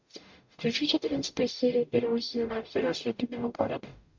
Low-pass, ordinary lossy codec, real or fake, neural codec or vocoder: 7.2 kHz; MP3, 64 kbps; fake; codec, 44.1 kHz, 0.9 kbps, DAC